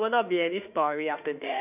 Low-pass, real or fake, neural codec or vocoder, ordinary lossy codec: 3.6 kHz; fake; autoencoder, 48 kHz, 32 numbers a frame, DAC-VAE, trained on Japanese speech; none